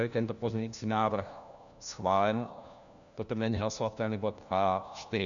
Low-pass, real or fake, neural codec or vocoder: 7.2 kHz; fake; codec, 16 kHz, 1 kbps, FunCodec, trained on LibriTTS, 50 frames a second